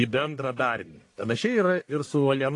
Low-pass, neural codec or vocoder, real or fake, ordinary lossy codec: 10.8 kHz; codec, 44.1 kHz, 1.7 kbps, Pupu-Codec; fake; AAC, 48 kbps